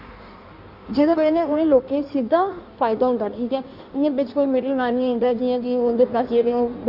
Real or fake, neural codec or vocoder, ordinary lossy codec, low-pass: fake; codec, 16 kHz in and 24 kHz out, 1.1 kbps, FireRedTTS-2 codec; none; 5.4 kHz